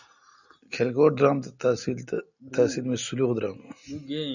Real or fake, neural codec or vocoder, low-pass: real; none; 7.2 kHz